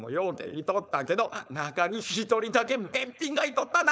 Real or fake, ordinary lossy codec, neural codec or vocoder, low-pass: fake; none; codec, 16 kHz, 4.8 kbps, FACodec; none